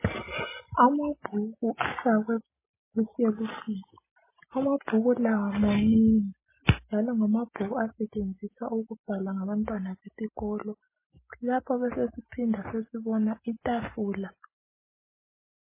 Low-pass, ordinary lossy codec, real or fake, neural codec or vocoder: 3.6 kHz; MP3, 16 kbps; real; none